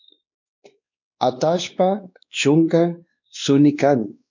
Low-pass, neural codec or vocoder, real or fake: 7.2 kHz; codec, 16 kHz, 2 kbps, X-Codec, WavLM features, trained on Multilingual LibriSpeech; fake